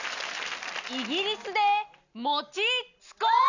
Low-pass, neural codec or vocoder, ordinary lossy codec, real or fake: 7.2 kHz; none; none; real